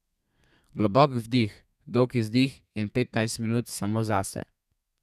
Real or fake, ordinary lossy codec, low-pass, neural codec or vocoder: fake; none; 14.4 kHz; codec, 32 kHz, 1.9 kbps, SNAC